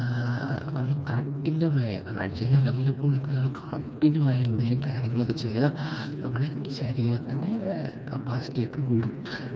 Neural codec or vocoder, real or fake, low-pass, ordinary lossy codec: codec, 16 kHz, 2 kbps, FreqCodec, smaller model; fake; none; none